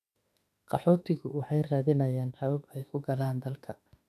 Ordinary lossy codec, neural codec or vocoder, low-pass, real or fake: none; autoencoder, 48 kHz, 32 numbers a frame, DAC-VAE, trained on Japanese speech; 14.4 kHz; fake